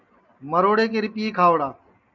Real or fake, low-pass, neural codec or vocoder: real; 7.2 kHz; none